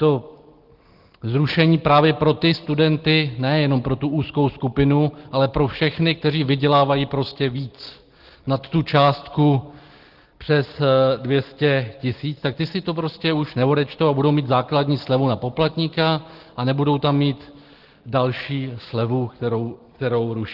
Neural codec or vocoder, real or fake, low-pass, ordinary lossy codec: none; real; 5.4 kHz; Opus, 16 kbps